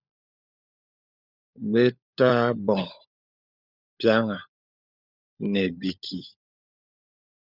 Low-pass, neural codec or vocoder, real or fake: 5.4 kHz; codec, 16 kHz, 16 kbps, FunCodec, trained on LibriTTS, 50 frames a second; fake